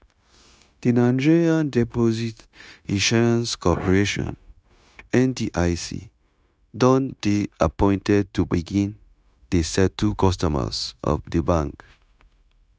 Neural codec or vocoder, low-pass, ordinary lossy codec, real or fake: codec, 16 kHz, 0.9 kbps, LongCat-Audio-Codec; none; none; fake